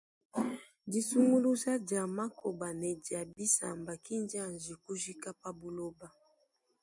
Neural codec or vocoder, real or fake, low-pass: none; real; 10.8 kHz